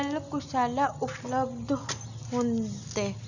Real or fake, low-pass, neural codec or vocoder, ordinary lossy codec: real; 7.2 kHz; none; none